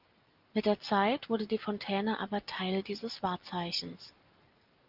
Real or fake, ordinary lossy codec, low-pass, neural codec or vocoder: real; Opus, 32 kbps; 5.4 kHz; none